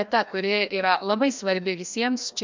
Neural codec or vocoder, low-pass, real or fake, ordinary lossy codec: codec, 16 kHz, 1 kbps, FreqCodec, larger model; 7.2 kHz; fake; MP3, 64 kbps